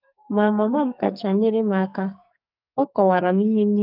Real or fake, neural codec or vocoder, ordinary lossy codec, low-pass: fake; codec, 44.1 kHz, 2.6 kbps, SNAC; none; 5.4 kHz